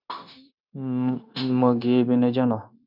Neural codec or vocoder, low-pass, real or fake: codec, 16 kHz, 0.9 kbps, LongCat-Audio-Codec; 5.4 kHz; fake